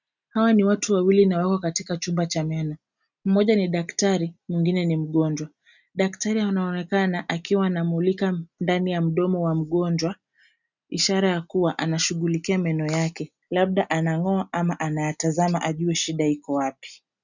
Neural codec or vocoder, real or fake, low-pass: none; real; 7.2 kHz